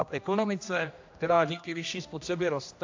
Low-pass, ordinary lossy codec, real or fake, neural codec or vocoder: 7.2 kHz; AAC, 48 kbps; fake; codec, 16 kHz, 1 kbps, X-Codec, HuBERT features, trained on general audio